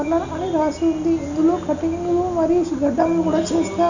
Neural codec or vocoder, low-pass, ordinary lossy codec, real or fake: none; 7.2 kHz; none; real